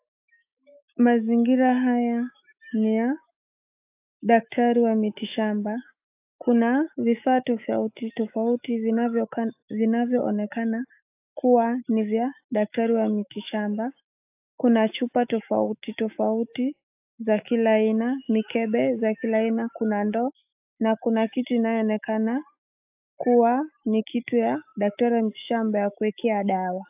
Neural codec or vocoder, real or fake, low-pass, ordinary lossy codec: autoencoder, 48 kHz, 128 numbers a frame, DAC-VAE, trained on Japanese speech; fake; 3.6 kHz; AAC, 32 kbps